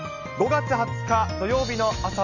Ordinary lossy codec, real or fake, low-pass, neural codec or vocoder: none; real; 7.2 kHz; none